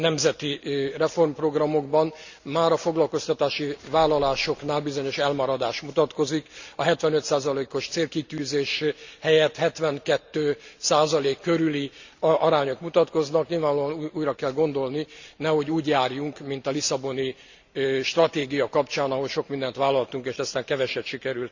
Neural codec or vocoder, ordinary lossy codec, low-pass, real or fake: none; Opus, 64 kbps; 7.2 kHz; real